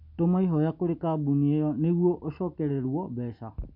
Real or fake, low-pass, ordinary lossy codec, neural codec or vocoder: real; 5.4 kHz; MP3, 48 kbps; none